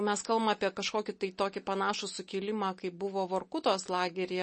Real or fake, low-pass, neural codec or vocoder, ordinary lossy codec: real; 10.8 kHz; none; MP3, 32 kbps